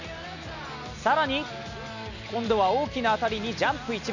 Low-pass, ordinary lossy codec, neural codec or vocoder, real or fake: 7.2 kHz; none; none; real